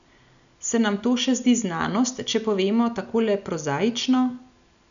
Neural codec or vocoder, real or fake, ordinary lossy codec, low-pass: none; real; none; 7.2 kHz